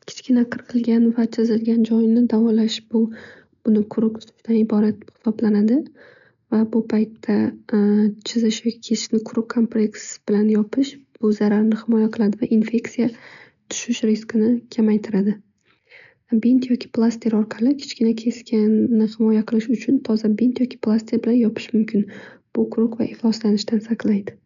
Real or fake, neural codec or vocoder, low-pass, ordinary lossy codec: real; none; 7.2 kHz; none